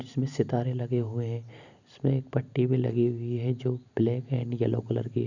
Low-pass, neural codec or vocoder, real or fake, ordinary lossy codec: 7.2 kHz; none; real; none